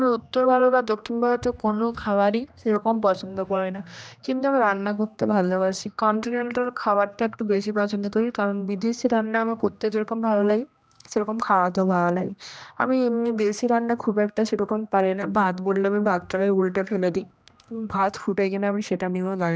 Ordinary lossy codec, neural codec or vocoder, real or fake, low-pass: none; codec, 16 kHz, 1 kbps, X-Codec, HuBERT features, trained on general audio; fake; none